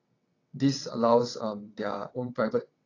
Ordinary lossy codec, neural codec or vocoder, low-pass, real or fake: AAC, 32 kbps; vocoder, 22.05 kHz, 80 mel bands, WaveNeXt; 7.2 kHz; fake